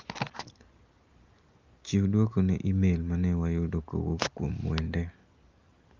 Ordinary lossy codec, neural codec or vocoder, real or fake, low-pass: Opus, 24 kbps; none; real; 7.2 kHz